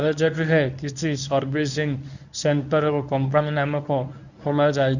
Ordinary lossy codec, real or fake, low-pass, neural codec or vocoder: none; fake; 7.2 kHz; codec, 24 kHz, 0.9 kbps, WavTokenizer, medium speech release version 1